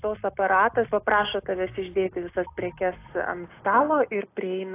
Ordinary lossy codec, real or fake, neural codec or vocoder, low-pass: AAC, 24 kbps; real; none; 3.6 kHz